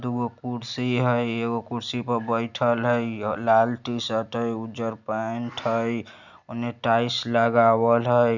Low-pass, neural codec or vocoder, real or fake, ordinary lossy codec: 7.2 kHz; none; real; none